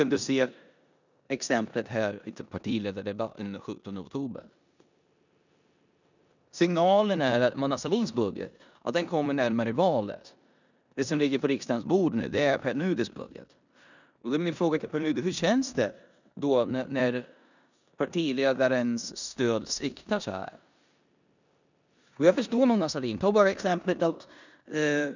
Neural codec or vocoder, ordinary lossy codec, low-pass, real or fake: codec, 16 kHz in and 24 kHz out, 0.9 kbps, LongCat-Audio-Codec, four codebook decoder; none; 7.2 kHz; fake